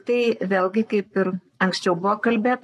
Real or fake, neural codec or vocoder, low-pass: fake; codec, 44.1 kHz, 7.8 kbps, Pupu-Codec; 14.4 kHz